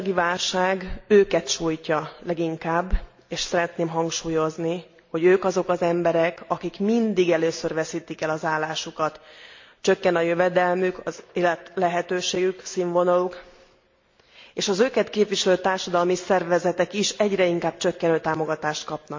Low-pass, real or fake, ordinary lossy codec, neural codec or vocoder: 7.2 kHz; real; MP3, 48 kbps; none